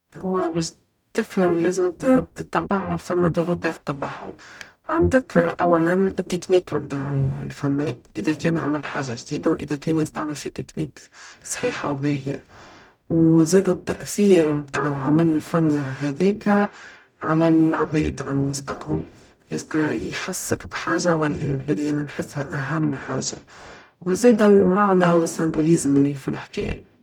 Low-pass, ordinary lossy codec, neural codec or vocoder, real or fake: 19.8 kHz; none; codec, 44.1 kHz, 0.9 kbps, DAC; fake